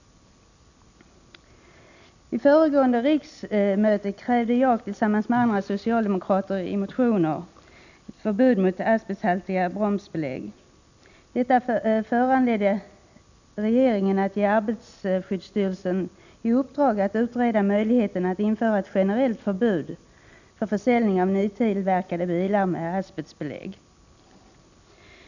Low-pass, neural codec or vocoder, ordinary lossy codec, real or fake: 7.2 kHz; none; none; real